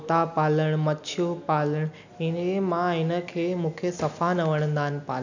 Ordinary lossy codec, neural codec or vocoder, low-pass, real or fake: none; none; 7.2 kHz; real